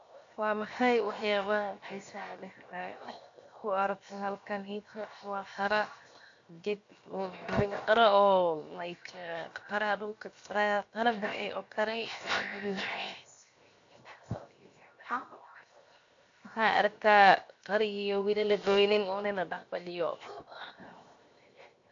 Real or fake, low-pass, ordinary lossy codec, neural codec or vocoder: fake; 7.2 kHz; AAC, 48 kbps; codec, 16 kHz, 0.7 kbps, FocalCodec